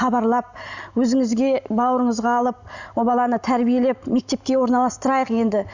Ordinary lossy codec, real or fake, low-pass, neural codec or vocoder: none; real; 7.2 kHz; none